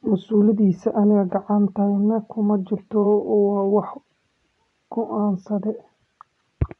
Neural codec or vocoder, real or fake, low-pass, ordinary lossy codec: vocoder, 24 kHz, 100 mel bands, Vocos; fake; 10.8 kHz; none